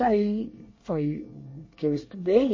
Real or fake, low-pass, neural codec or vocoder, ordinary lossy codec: fake; 7.2 kHz; codec, 24 kHz, 1 kbps, SNAC; MP3, 32 kbps